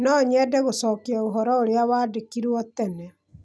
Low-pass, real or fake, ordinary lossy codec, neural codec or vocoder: none; real; none; none